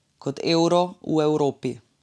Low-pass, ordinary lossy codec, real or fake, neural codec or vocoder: none; none; real; none